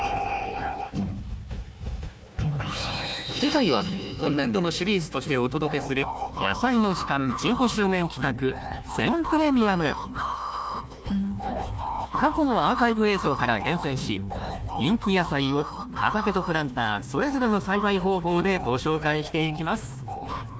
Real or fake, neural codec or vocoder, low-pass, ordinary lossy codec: fake; codec, 16 kHz, 1 kbps, FunCodec, trained on Chinese and English, 50 frames a second; none; none